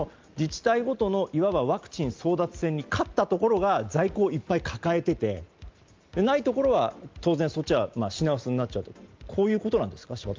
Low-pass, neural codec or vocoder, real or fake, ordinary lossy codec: 7.2 kHz; none; real; Opus, 32 kbps